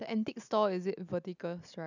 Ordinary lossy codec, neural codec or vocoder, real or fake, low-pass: none; none; real; 7.2 kHz